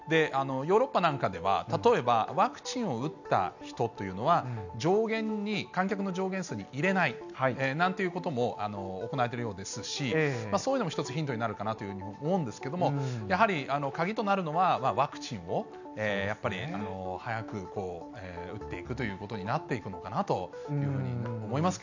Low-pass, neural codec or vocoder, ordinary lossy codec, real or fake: 7.2 kHz; none; none; real